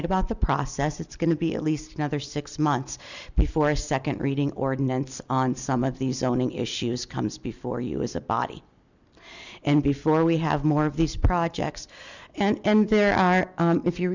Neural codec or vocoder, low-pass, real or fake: none; 7.2 kHz; real